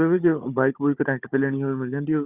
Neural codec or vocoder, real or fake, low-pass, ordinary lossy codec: codec, 16 kHz, 16 kbps, FunCodec, trained on Chinese and English, 50 frames a second; fake; 3.6 kHz; Opus, 64 kbps